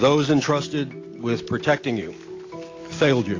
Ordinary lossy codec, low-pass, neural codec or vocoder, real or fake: AAC, 32 kbps; 7.2 kHz; none; real